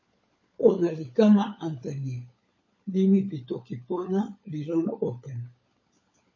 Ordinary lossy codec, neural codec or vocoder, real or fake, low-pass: MP3, 32 kbps; codec, 16 kHz, 16 kbps, FunCodec, trained on LibriTTS, 50 frames a second; fake; 7.2 kHz